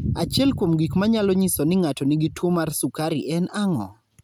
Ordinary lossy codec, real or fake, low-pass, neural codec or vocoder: none; real; none; none